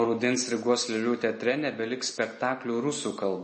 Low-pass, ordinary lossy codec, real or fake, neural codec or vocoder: 10.8 kHz; MP3, 32 kbps; real; none